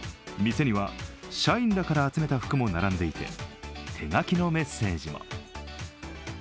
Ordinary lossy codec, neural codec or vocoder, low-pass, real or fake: none; none; none; real